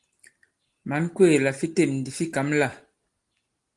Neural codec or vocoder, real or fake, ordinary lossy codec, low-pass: none; real; Opus, 24 kbps; 10.8 kHz